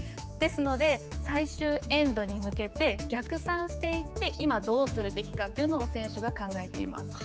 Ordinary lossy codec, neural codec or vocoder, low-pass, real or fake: none; codec, 16 kHz, 4 kbps, X-Codec, HuBERT features, trained on general audio; none; fake